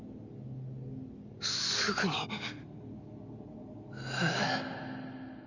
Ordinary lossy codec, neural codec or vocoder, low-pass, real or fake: none; none; 7.2 kHz; real